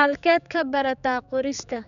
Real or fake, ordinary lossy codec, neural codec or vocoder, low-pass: fake; none; codec, 16 kHz, 4 kbps, X-Codec, HuBERT features, trained on general audio; 7.2 kHz